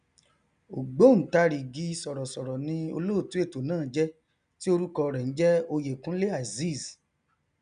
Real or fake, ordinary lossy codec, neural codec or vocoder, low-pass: real; none; none; 9.9 kHz